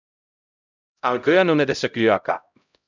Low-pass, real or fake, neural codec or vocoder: 7.2 kHz; fake; codec, 16 kHz, 0.5 kbps, X-Codec, HuBERT features, trained on LibriSpeech